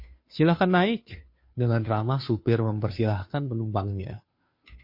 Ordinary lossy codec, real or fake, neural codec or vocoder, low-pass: MP3, 32 kbps; fake; codec, 16 kHz, 2 kbps, FunCodec, trained on Chinese and English, 25 frames a second; 5.4 kHz